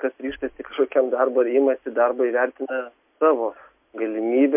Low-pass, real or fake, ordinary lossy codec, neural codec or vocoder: 3.6 kHz; real; MP3, 32 kbps; none